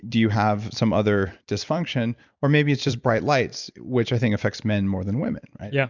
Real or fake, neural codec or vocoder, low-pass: fake; vocoder, 44.1 kHz, 128 mel bands every 256 samples, BigVGAN v2; 7.2 kHz